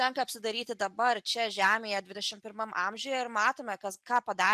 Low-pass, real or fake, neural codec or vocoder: 14.4 kHz; real; none